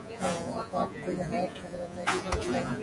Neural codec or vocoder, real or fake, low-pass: vocoder, 48 kHz, 128 mel bands, Vocos; fake; 10.8 kHz